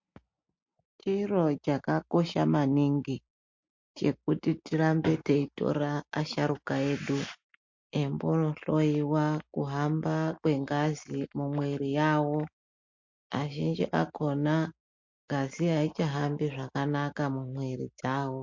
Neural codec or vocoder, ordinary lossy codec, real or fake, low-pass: none; MP3, 48 kbps; real; 7.2 kHz